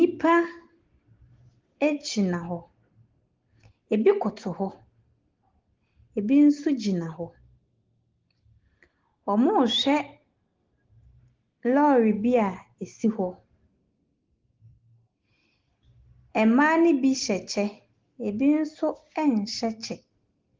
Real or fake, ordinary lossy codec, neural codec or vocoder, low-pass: real; Opus, 16 kbps; none; 7.2 kHz